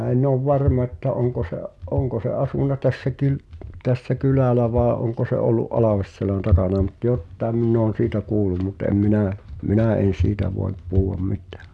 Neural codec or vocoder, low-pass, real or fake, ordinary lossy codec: none; none; real; none